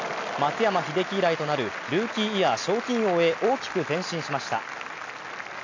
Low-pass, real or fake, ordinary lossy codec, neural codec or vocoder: 7.2 kHz; real; MP3, 64 kbps; none